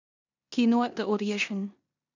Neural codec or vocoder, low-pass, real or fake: codec, 16 kHz in and 24 kHz out, 0.9 kbps, LongCat-Audio-Codec, four codebook decoder; 7.2 kHz; fake